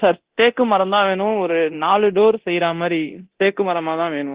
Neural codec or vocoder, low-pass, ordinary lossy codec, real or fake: codec, 24 kHz, 0.9 kbps, DualCodec; 3.6 kHz; Opus, 16 kbps; fake